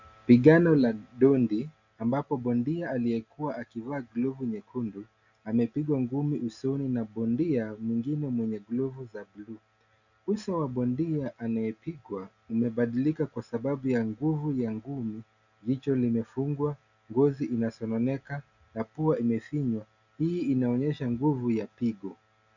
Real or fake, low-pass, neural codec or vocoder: real; 7.2 kHz; none